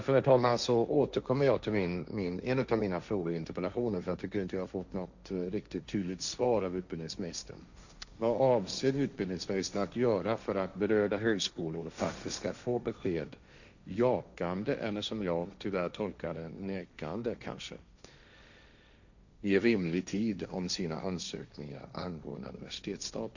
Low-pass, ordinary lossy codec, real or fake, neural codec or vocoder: 7.2 kHz; none; fake; codec, 16 kHz, 1.1 kbps, Voila-Tokenizer